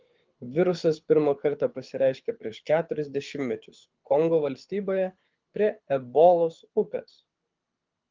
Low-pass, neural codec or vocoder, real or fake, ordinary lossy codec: 7.2 kHz; codec, 24 kHz, 6 kbps, HILCodec; fake; Opus, 32 kbps